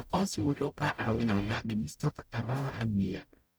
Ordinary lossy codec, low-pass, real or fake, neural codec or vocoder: none; none; fake; codec, 44.1 kHz, 0.9 kbps, DAC